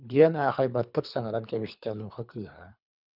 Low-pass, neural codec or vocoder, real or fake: 5.4 kHz; codec, 24 kHz, 3 kbps, HILCodec; fake